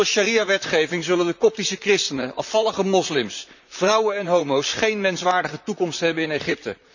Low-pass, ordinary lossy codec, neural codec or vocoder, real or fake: 7.2 kHz; none; vocoder, 44.1 kHz, 128 mel bands, Pupu-Vocoder; fake